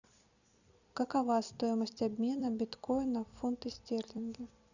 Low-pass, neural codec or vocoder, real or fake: 7.2 kHz; vocoder, 44.1 kHz, 128 mel bands every 256 samples, BigVGAN v2; fake